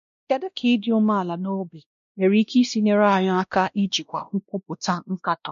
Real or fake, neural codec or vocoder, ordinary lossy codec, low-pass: fake; codec, 16 kHz, 1 kbps, X-Codec, WavLM features, trained on Multilingual LibriSpeech; MP3, 48 kbps; 7.2 kHz